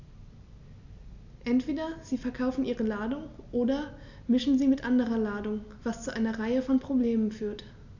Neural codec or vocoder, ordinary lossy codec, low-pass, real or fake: none; none; 7.2 kHz; real